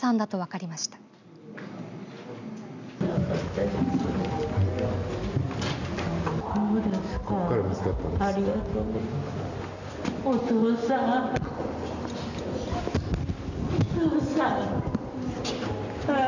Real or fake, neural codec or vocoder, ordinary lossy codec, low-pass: real; none; none; 7.2 kHz